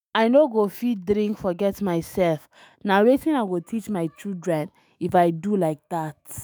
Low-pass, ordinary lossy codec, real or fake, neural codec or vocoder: none; none; fake; autoencoder, 48 kHz, 128 numbers a frame, DAC-VAE, trained on Japanese speech